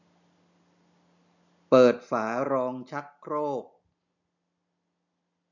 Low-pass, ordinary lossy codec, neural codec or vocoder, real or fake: 7.2 kHz; none; none; real